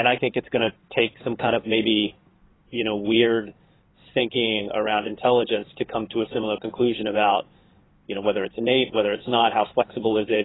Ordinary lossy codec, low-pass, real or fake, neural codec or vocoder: AAC, 16 kbps; 7.2 kHz; fake; codec, 16 kHz in and 24 kHz out, 2.2 kbps, FireRedTTS-2 codec